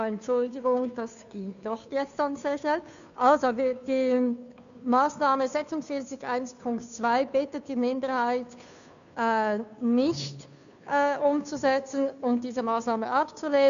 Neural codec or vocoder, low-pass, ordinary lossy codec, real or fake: codec, 16 kHz, 2 kbps, FunCodec, trained on Chinese and English, 25 frames a second; 7.2 kHz; none; fake